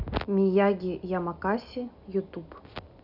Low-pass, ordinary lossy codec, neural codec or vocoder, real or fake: 5.4 kHz; none; none; real